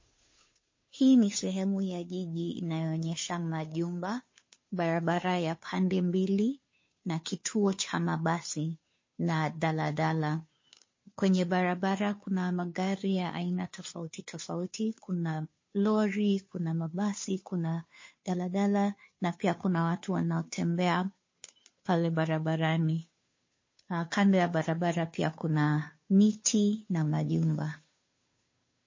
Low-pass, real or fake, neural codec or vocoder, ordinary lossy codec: 7.2 kHz; fake; codec, 16 kHz, 2 kbps, FunCodec, trained on Chinese and English, 25 frames a second; MP3, 32 kbps